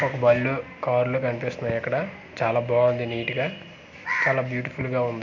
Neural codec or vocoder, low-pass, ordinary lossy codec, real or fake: none; 7.2 kHz; MP3, 48 kbps; real